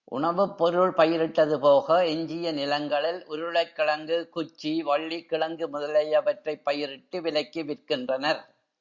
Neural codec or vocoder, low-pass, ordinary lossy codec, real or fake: none; 7.2 kHz; Opus, 64 kbps; real